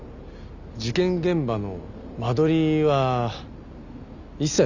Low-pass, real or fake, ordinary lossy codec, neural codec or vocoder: 7.2 kHz; real; none; none